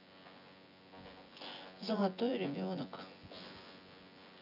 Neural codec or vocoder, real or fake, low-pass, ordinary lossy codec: vocoder, 24 kHz, 100 mel bands, Vocos; fake; 5.4 kHz; none